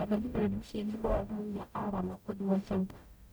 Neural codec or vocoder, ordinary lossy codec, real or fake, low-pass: codec, 44.1 kHz, 0.9 kbps, DAC; none; fake; none